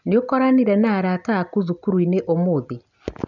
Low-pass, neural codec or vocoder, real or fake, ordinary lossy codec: 7.2 kHz; none; real; none